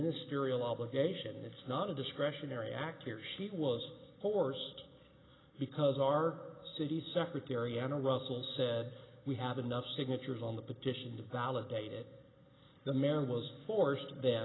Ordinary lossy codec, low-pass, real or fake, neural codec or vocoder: AAC, 16 kbps; 7.2 kHz; real; none